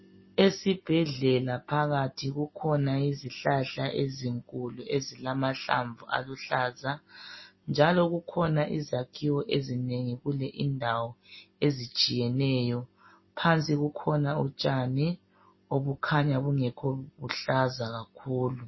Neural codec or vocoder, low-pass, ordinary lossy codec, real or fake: none; 7.2 kHz; MP3, 24 kbps; real